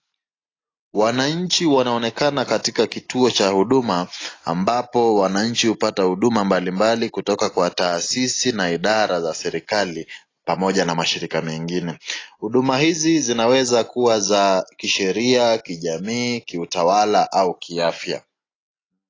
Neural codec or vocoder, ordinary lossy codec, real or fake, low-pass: none; AAC, 32 kbps; real; 7.2 kHz